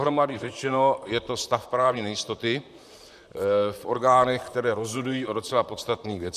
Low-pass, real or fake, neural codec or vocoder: 14.4 kHz; fake; vocoder, 44.1 kHz, 128 mel bands, Pupu-Vocoder